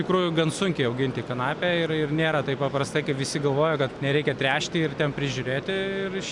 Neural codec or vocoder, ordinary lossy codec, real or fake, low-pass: none; MP3, 96 kbps; real; 10.8 kHz